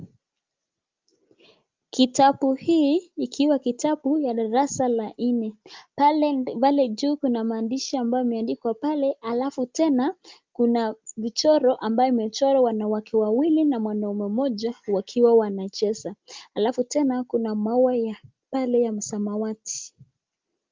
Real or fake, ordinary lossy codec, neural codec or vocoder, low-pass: real; Opus, 24 kbps; none; 7.2 kHz